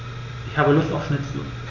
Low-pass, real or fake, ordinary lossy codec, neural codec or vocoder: 7.2 kHz; real; none; none